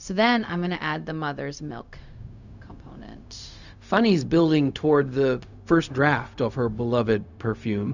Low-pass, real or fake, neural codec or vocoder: 7.2 kHz; fake; codec, 16 kHz, 0.4 kbps, LongCat-Audio-Codec